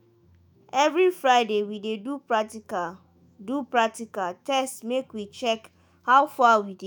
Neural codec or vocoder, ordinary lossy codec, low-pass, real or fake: autoencoder, 48 kHz, 128 numbers a frame, DAC-VAE, trained on Japanese speech; none; none; fake